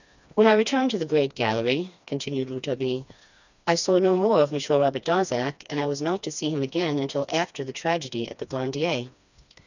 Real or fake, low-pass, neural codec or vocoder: fake; 7.2 kHz; codec, 16 kHz, 2 kbps, FreqCodec, smaller model